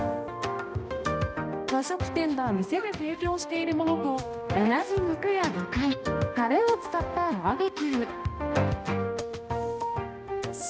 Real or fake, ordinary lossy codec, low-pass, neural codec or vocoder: fake; none; none; codec, 16 kHz, 1 kbps, X-Codec, HuBERT features, trained on balanced general audio